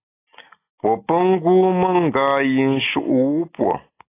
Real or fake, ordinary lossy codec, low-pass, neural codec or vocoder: real; AAC, 24 kbps; 3.6 kHz; none